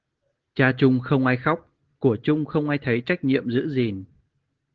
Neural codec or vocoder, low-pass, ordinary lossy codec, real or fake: none; 7.2 kHz; Opus, 16 kbps; real